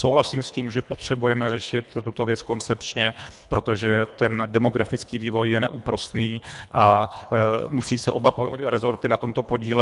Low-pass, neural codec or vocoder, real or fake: 10.8 kHz; codec, 24 kHz, 1.5 kbps, HILCodec; fake